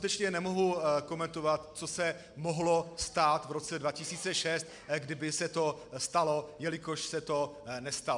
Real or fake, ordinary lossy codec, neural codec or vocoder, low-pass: real; MP3, 96 kbps; none; 10.8 kHz